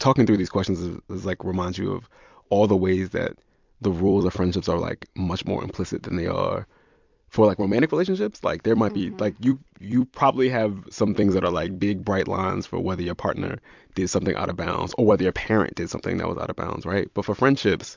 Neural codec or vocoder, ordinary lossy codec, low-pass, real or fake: vocoder, 44.1 kHz, 128 mel bands every 256 samples, BigVGAN v2; MP3, 64 kbps; 7.2 kHz; fake